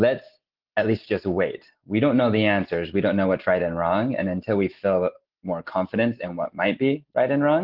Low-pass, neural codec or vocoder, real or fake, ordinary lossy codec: 5.4 kHz; none; real; Opus, 16 kbps